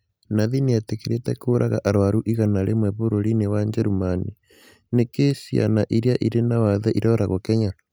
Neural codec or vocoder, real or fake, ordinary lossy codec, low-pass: none; real; none; none